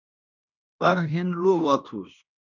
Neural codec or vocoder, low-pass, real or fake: codec, 16 kHz in and 24 kHz out, 0.9 kbps, LongCat-Audio-Codec, fine tuned four codebook decoder; 7.2 kHz; fake